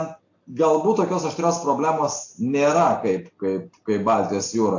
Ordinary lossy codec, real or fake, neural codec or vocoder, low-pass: AAC, 48 kbps; real; none; 7.2 kHz